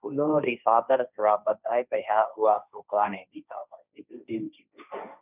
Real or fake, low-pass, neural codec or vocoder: fake; 3.6 kHz; codec, 16 kHz, 1.1 kbps, Voila-Tokenizer